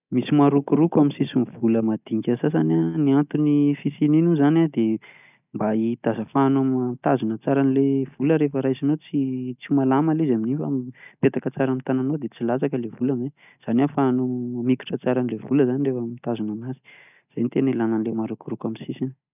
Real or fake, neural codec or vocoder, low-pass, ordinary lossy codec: real; none; 3.6 kHz; none